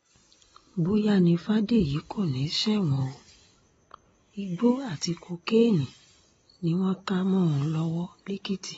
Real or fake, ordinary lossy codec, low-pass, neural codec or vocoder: real; AAC, 24 kbps; 10.8 kHz; none